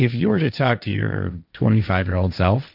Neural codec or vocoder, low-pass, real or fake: codec, 16 kHz, 1.1 kbps, Voila-Tokenizer; 5.4 kHz; fake